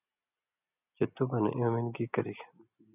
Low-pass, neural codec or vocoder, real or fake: 3.6 kHz; none; real